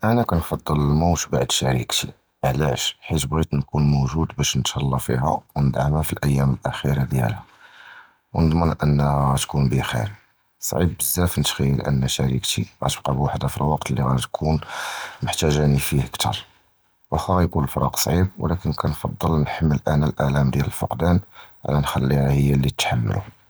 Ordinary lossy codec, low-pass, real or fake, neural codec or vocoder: none; none; real; none